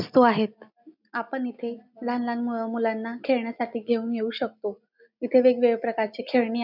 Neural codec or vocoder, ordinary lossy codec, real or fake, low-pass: none; none; real; 5.4 kHz